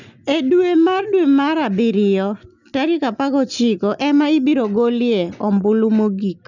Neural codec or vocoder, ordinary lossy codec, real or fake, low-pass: none; none; real; 7.2 kHz